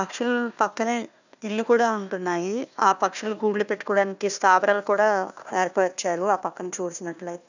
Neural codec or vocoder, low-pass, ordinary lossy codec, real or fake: codec, 16 kHz, 1 kbps, FunCodec, trained on Chinese and English, 50 frames a second; 7.2 kHz; none; fake